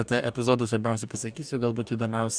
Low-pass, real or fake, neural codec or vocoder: 9.9 kHz; fake; codec, 44.1 kHz, 2.6 kbps, DAC